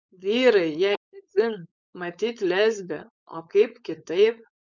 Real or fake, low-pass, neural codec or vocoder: fake; 7.2 kHz; codec, 16 kHz, 4.8 kbps, FACodec